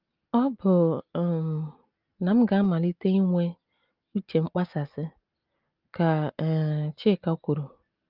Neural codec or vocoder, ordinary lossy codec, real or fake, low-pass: none; Opus, 32 kbps; real; 5.4 kHz